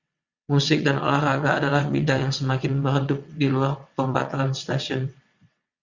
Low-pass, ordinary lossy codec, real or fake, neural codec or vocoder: 7.2 kHz; Opus, 64 kbps; fake; vocoder, 22.05 kHz, 80 mel bands, WaveNeXt